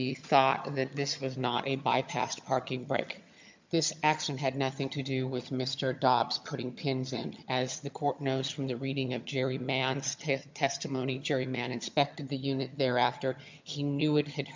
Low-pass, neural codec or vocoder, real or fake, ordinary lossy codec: 7.2 kHz; vocoder, 22.05 kHz, 80 mel bands, HiFi-GAN; fake; MP3, 64 kbps